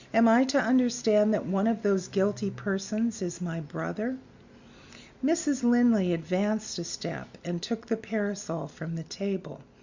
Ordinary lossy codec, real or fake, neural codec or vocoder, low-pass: Opus, 64 kbps; real; none; 7.2 kHz